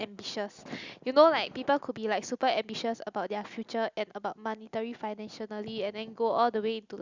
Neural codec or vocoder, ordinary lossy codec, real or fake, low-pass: none; Opus, 64 kbps; real; 7.2 kHz